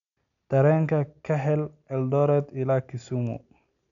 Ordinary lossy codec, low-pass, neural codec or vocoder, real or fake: none; 7.2 kHz; none; real